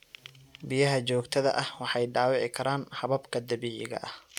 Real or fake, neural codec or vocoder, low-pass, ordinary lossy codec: real; none; 19.8 kHz; none